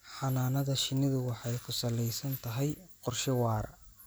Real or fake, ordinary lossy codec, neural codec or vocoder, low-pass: real; none; none; none